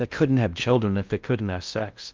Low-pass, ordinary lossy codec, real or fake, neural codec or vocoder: 7.2 kHz; Opus, 24 kbps; fake; codec, 16 kHz in and 24 kHz out, 0.6 kbps, FocalCodec, streaming, 4096 codes